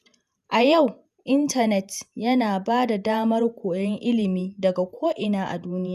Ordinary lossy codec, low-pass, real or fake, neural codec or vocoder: none; 14.4 kHz; fake; vocoder, 48 kHz, 128 mel bands, Vocos